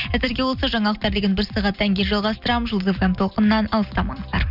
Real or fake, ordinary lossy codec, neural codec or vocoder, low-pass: real; none; none; 5.4 kHz